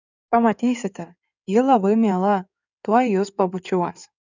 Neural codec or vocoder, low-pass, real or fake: codec, 16 kHz in and 24 kHz out, 2.2 kbps, FireRedTTS-2 codec; 7.2 kHz; fake